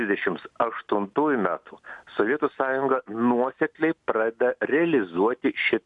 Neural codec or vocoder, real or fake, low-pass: none; real; 10.8 kHz